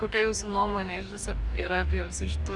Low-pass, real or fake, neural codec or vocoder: 10.8 kHz; fake; codec, 44.1 kHz, 2.6 kbps, DAC